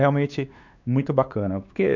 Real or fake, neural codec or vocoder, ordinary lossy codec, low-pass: fake; codec, 16 kHz, 2 kbps, X-Codec, WavLM features, trained on Multilingual LibriSpeech; none; 7.2 kHz